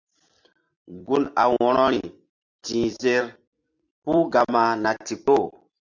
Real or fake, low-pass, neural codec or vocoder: fake; 7.2 kHz; vocoder, 44.1 kHz, 128 mel bands every 256 samples, BigVGAN v2